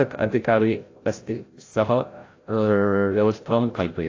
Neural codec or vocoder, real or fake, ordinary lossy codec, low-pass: codec, 16 kHz, 0.5 kbps, FreqCodec, larger model; fake; AAC, 32 kbps; 7.2 kHz